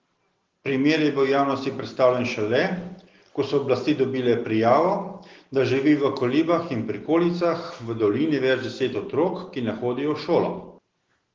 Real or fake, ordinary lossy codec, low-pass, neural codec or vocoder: real; Opus, 16 kbps; 7.2 kHz; none